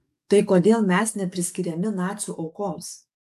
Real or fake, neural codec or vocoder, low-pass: fake; codec, 44.1 kHz, 7.8 kbps, DAC; 14.4 kHz